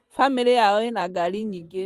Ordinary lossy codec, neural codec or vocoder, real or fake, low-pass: Opus, 32 kbps; vocoder, 44.1 kHz, 128 mel bands, Pupu-Vocoder; fake; 14.4 kHz